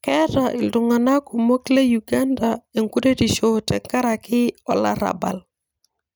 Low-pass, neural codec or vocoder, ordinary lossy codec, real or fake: none; none; none; real